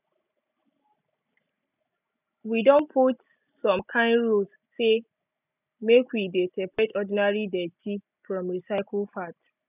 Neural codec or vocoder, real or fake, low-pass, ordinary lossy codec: none; real; 3.6 kHz; none